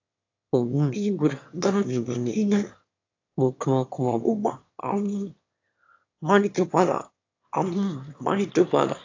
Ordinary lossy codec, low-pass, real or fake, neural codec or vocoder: AAC, 48 kbps; 7.2 kHz; fake; autoencoder, 22.05 kHz, a latent of 192 numbers a frame, VITS, trained on one speaker